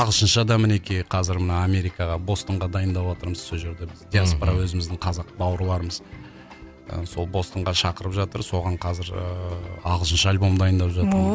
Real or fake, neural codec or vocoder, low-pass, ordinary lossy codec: real; none; none; none